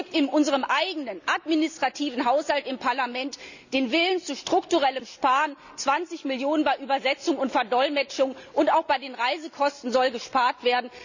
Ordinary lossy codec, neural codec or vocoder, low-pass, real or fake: none; none; 7.2 kHz; real